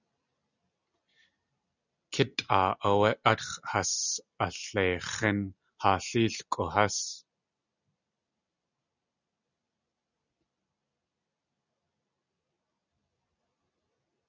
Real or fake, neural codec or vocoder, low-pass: real; none; 7.2 kHz